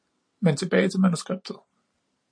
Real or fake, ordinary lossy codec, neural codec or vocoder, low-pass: real; MP3, 48 kbps; none; 9.9 kHz